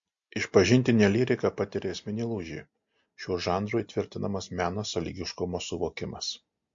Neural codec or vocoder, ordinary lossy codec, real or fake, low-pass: none; MP3, 48 kbps; real; 7.2 kHz